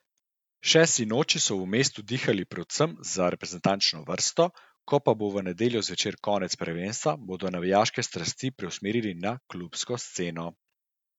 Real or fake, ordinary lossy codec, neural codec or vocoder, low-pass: real; none; none; 19.8 kHz